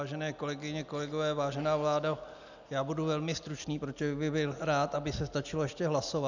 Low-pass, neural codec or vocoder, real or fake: 7.2 kHz; none; real